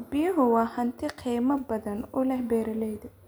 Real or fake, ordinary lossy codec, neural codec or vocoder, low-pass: real; none; none; none